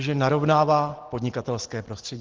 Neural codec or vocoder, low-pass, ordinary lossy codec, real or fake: none; 7.2 kHz; Opus, 16 kbps; real